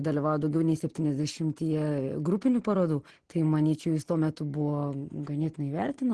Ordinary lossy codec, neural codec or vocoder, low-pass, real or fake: Opus, 16 kbps; none; 10.8 kHz; real